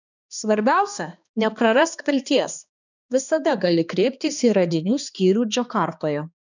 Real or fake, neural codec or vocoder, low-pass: fake; codec, 16 kHz, 2 kbps, X-Codec, HuBERT features, trained on balanced general audio; 7.2 kHz